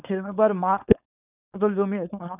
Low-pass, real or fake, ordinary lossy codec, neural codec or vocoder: 3.6 kHz; fake; none; codec, 16 kHz, 4.8 kbps, FACodec